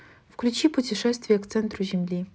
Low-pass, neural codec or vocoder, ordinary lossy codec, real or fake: none; none; none; real